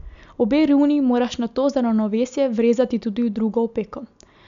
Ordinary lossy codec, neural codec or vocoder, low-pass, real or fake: none; none; 7.2 kHz; real